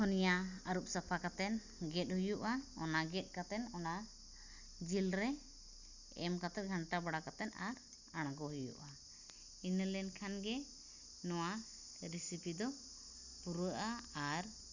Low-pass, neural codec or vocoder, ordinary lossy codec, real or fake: 7.2 kHz; none; Opus, 64 kbps; real